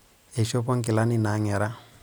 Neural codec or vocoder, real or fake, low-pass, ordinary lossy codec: none; real; none; none